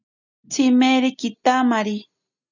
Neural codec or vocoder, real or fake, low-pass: none; real; 7.2 kHz